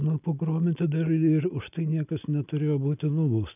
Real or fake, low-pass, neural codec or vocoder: real; 3.6 kHz; none